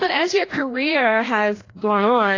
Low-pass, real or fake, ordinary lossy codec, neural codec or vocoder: 7.2 kHz; fake; AAC, 32 kbps; codec, 16 kHz, 1 kbps, FreqCodec, larger model